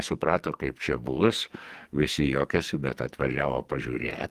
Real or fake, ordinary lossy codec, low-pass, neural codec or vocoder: fake; Opus, 24 kbps; 14.4 kHz; codec, 44.1 kHz, 2.6 kbps, SNAC